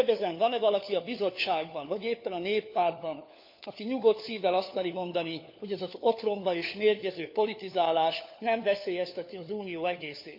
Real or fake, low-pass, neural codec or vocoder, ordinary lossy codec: fake; 5.4 kHz; codec, 16 kHz, 4 kbps, FunCodec, trained on LibriTTS, 50 frames a second; MP3, 48 kbps